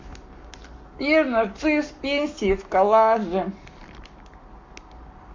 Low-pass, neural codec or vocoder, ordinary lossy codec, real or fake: 7.2 kHz; codec, 44.1 kHz, 7.8 kbps, Pupu-Codec; MP3, 64 kbps; fake